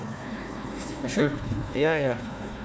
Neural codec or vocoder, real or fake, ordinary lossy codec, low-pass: codec, 16 kHz, 1 kbps, FunCodec, trained on Chinese and English, 50 frames a second; fake; none; none